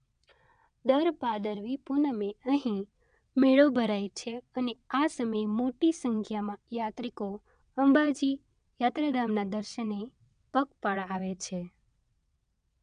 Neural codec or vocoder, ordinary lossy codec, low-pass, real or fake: vocoder, 22.05 kHz, 80 mel bands, WaveNeXt; none; 9.9 kHz; fake